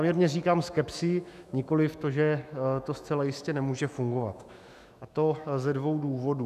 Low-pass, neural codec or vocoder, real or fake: 14.4 kHz; autoencoder, 48 kHz, 128 numbers a frame, DAC-VAE, trained on Japanese speech; fake